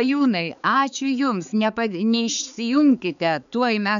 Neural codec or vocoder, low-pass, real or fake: codec, 16 kHz, 4 kbps, X-Codec, HuBERT features, trained on balanced general audio; 7.2 kHz; fake